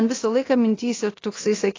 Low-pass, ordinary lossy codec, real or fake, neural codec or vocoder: 7.2 kHz; AAC, 32 kbps; fake; codec, 24 kHz, 0.9 kbps, DualCodec